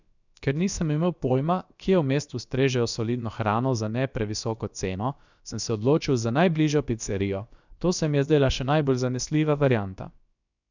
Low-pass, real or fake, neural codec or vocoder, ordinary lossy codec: 7.2 kHz; fake; codec, 16 kHz, about 1 kbps, DyCAST, with the encoder's durations; none